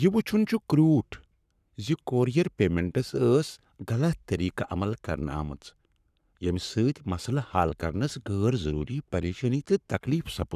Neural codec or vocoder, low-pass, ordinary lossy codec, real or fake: codec, 44.1 kHz, 7.8 kbps, Pupu-Codec; 14.4 kHz; none; fake